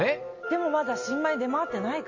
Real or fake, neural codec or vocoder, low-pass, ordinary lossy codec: real; none; 7.2 kHz; MP3, 32 kbps